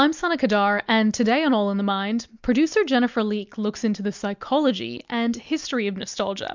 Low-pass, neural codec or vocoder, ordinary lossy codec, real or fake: 7.2 kHz; none; MP3, 64 kbps; real